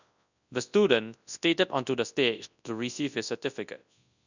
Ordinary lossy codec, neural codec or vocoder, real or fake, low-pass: none; codec, 24 kHz, 0.9 kbps, WavTokenizer, large speech release; fake; 7.2 kHz